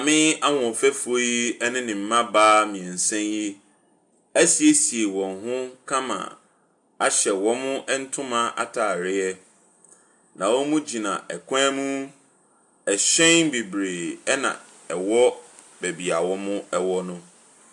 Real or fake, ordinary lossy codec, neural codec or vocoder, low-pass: real; AAC, 64 kbps; none; 10.8 kHz